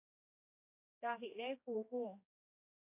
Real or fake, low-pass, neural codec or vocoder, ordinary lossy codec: fake; 3.6 kHz; codec, 16 kHz, 2 kbps, X-Codec, HuBERT features, trained on general audio; AAC, 24 kbps